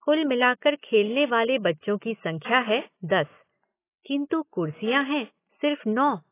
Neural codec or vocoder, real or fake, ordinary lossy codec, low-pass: none; real; AAC, 24 kbps; 3.6 kHz